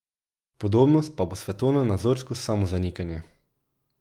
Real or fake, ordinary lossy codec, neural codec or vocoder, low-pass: fake; Opus, 32 kbps; autoencoder, 48 kHz, 128 numbers a frame, DAC-VAE, trained on Japanese speech; 19.8 kHz